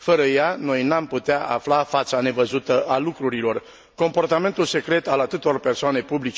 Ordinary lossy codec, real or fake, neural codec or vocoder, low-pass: none; real; none; none